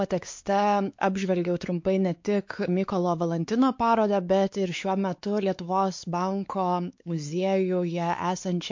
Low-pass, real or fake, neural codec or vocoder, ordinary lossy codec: 7.2 kHz; fake; codec, 16 kHz, 4 kbps, X-Codec, WavLM features, trained on Multilingual LibriSpeech; MP3, 48 kbps